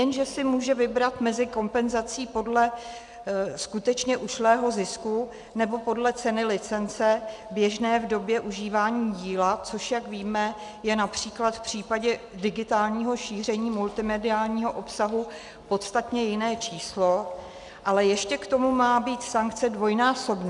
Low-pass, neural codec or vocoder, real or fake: 10.8 kHz; none; real